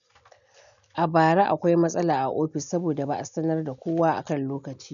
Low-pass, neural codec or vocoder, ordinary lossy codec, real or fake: 7.2 kHz; none; none; real